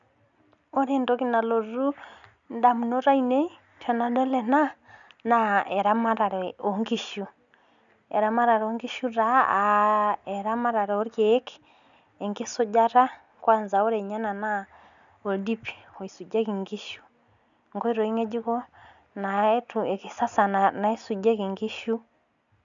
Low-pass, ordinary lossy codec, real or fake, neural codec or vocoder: 7.2 kHz; none; real; none